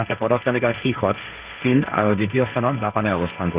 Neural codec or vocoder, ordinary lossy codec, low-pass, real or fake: codec, 16 kHz, 1.1 kbps, Voila-Tokenizer; Opus, 64 kbps; 3.6 kHz; fake